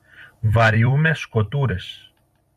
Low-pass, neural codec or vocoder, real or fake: 14.4 kHz; vocoder, 44.1 kHz, 128 mel bands every 256 samples, BigVGAN v2; fake